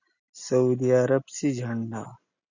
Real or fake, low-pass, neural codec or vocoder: real; 7.2 kHz; none